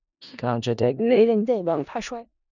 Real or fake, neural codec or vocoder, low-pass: fake; codec, 16 kHz in and 24 kHz out, 0.4 kbps, LongCat-Audio-Codec, four codebook decoder; 7.2 kHz